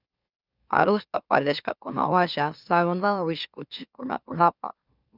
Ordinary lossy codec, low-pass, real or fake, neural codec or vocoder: Opus, 64 kbps; 5.4 kHz; fake; autoencoder, 44.1 kHz, a latent of 192 numbers a frame, MeloTTS